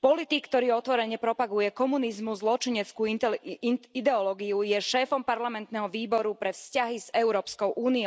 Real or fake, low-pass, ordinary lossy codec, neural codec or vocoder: real; none; none; none